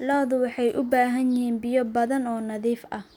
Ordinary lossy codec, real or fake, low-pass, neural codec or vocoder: none; real; 19.8 kHz; none